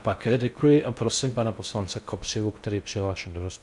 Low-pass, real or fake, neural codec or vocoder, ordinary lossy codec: 10.8 kHz; fake; codec, 16 kHz in and 24 kHz out, 0.6 kbps, FocalCodec, streaming, 4096 codes; MP3, 96 kbps